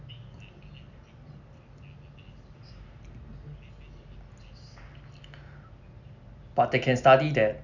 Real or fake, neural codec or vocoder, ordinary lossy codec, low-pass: real; none; none; 7.2 kHz